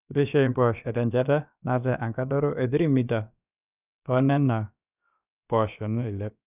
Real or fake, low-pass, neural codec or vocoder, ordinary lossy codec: fake; 3.6 kHz; codec, 16 kHz, about 1 kbps, DyCAST, with the encoder's durations; none